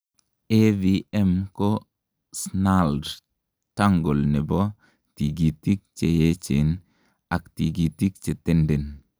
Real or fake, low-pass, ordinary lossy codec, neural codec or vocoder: real; none; none; none